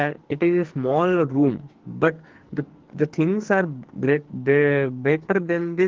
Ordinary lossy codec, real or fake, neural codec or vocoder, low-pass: Opus, 16 kbps; fake; codec, 44.1 kHz, 2.6 kbps, SNAC; 7.2 kHz